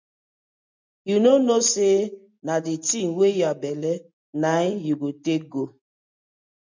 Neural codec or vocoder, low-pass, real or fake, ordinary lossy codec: none; 7.2 kHz; real; MP3, 64 kbps